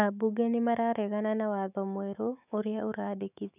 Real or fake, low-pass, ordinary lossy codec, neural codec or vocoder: fake; 3.6 kHz; none; autoencoder, 48 kHz, 128 numbers a frame, DAC-VAE, trained on Japanese speech